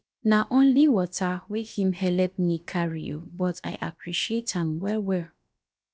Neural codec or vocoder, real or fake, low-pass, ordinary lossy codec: codec, 16 kHz, about 1 kbps, DyCAST, with the encoder's durations; fake; none; none